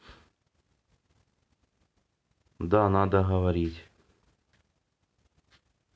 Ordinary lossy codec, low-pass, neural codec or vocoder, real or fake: none; none; none; real